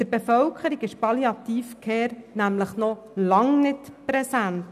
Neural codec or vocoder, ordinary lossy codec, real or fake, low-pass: none; none; real; 14.4 kHz